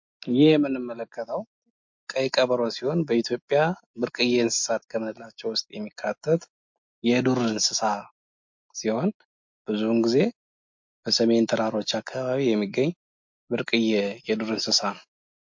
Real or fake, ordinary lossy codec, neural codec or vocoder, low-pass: real; MP3, 48 kbps; none; 7.2 kHz